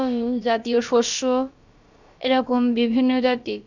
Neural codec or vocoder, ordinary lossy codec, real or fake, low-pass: codec, 16 kHz, about 1 kbps, DyCAST, with the encoder's durations; none; fake; 7.2 kHz